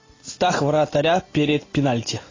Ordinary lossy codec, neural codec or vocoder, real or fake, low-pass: AAC, 32 kbps; none; real; 7.2 kHz